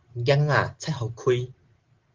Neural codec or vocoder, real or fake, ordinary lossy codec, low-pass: none; real; Opus, 16 kbps; 7.2 kHz